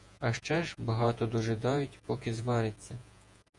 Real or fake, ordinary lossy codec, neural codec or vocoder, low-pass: fake; Opus, 64 kbps; vocoder, 48 kHz, 128 mel bands, Vocos; 10.8 kHz